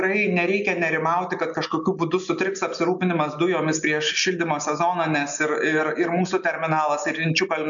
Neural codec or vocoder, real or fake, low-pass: none; real; 7.2 kHz